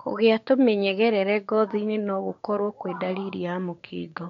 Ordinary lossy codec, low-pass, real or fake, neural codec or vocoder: MP3, 48 kbps; 7.2 kHz; fake; codec, 16 kHz, 6 kbps, DAC